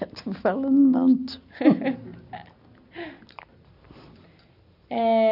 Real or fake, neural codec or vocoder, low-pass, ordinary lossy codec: real; none; 5.4 kHz; none